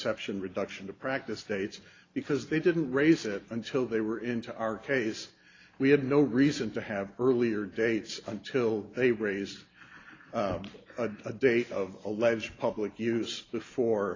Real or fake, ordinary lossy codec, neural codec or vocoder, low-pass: real; AAC, 32 kbps; none; 7.2 kHz